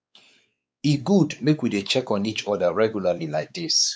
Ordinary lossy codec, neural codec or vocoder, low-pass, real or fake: none; codec, 16 kHz, 4 kbps, X-Codec, WavLM features, trained on Multilingual LibriSpeech; none; fake